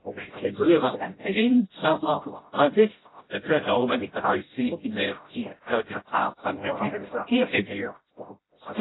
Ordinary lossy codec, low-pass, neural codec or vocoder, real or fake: AAC, 16 kbps; 7.2 kHz; codec, 16 kHz, 0.5 kbps, FreqCodec, smaller model; fake